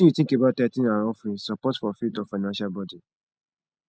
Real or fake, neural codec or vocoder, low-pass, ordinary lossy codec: real; none; none; none